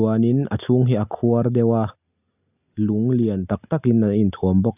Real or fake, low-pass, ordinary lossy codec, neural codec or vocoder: real; 3.6 kHz; none; none